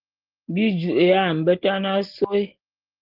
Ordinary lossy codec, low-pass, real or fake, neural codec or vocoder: Opus, 16 kbps; 5.4 kHz; real; none